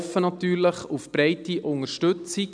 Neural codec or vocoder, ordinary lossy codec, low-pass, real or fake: none; none; 9.9 kHz; real